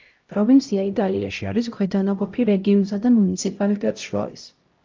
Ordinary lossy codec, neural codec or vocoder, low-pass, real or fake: Opus, 24 kbps; codec, 16 kHz, 0.5 kbps, X-Codec, HuBERT features, trained on LibriSpeech; 7.2 kHz; fake